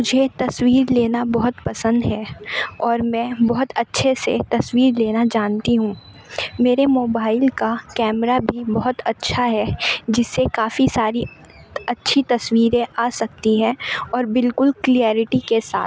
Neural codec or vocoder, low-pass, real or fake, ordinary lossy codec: none; none; real; none